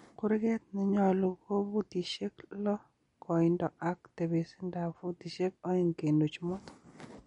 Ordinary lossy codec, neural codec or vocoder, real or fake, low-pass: MP3, 48 kbps; none; real; 10.8 kHz